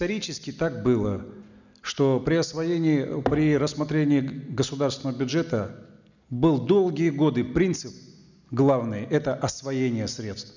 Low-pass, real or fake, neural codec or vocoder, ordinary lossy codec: 7.2 kHz; real; none; none